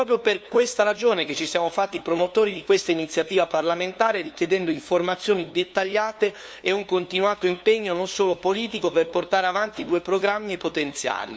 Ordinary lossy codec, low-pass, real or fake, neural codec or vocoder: none; none; fake; codec, 16 kHz, 2 kbps, FunCodec, trained on LibriTTS, 25 frames a second